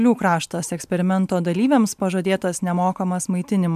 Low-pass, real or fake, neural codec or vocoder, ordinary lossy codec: 14.4 kHz; real; none; MP3, 96 kbps